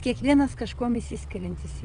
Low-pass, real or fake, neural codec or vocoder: 9.9 kHz; fake; vocoder, 22.05 kHz, 80 mel bands, WaveNeXt